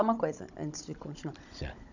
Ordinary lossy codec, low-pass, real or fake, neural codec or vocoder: none; 7.2 kHz; fake; codec, 16 kHz, 16 kbps, FunCodec, trained on Chinese and English, 50 frames a second